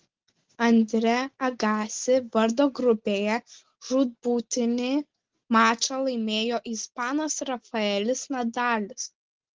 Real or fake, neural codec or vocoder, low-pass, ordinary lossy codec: real; none; 7.2 kHz; Opus, 16 kbps